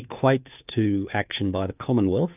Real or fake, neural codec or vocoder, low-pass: fake; codec, 16 kHz, 2 kbps, FunCodec, trained on Chinese and English, 25 frames a second; 3.6 kHz